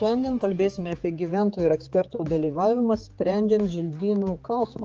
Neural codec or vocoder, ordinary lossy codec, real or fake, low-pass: codec, 16 kHz, 4 kbps, X-Codec, HuBERT features, trained on general audio; Opus, 24 kbps; fake; 7.2 kHz